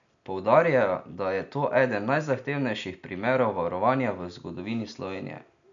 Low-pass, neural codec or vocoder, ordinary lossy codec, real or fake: 7.2 kHz; none; none; real